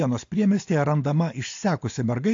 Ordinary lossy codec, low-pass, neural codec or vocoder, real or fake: AAC, 48 kbps; 7.2 kHz; none; real